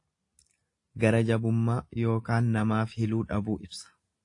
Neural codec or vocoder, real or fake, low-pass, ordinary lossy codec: none; real; 10.8 kHz; AAC, 48 kbps